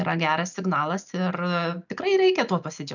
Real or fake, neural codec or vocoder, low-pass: real; none; 7.2 kHz